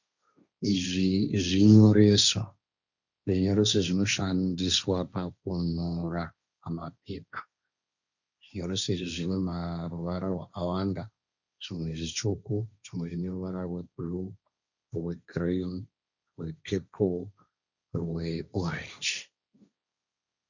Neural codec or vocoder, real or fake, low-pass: codec, 16 kHz, 1.1 kbps, Voila-Tokenizer; fake; 7.2 kHz